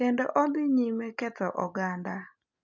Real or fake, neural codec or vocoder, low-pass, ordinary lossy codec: real; none; 7.2 kHz; none